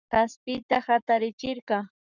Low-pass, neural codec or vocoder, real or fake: 7.2 kHz; codec, 44.1 kHz, 7.8 kbps, Pupu-Codec; fake